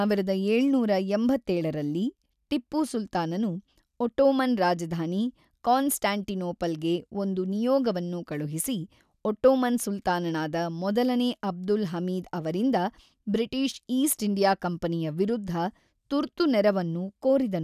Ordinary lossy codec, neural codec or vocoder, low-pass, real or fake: none; none; 14.4 kHz; real